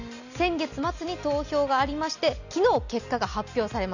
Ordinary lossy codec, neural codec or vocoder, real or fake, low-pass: none; none; real; 7.2 kHz